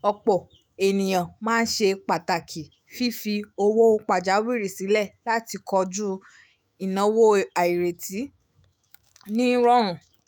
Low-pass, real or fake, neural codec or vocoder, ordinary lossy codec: none; fake; autoencoder, 48 kHz, 128 numbers a frame, DAC-VAE, trained on Japanese speech; none